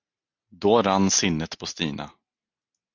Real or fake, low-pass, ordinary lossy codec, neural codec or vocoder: real; 7.2 kHz; Opus, 64 kbps; none